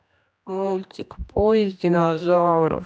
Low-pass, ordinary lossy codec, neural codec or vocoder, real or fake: none; none; codec, 16 kHz, 1 kbps, X-Codec, HuBERT features, trained on general audio; fake